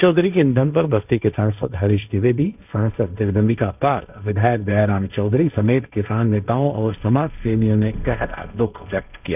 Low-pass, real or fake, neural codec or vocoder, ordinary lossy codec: 3.6 kHz; fake; codec, 16 kHz, 1.1 kbps, Voila-Tokenizer; none